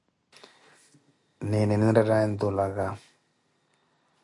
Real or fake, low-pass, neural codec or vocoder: real; 10.8 kHz; none